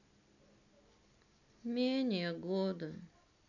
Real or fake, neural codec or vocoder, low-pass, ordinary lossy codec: real; none; 7.2 kHz; none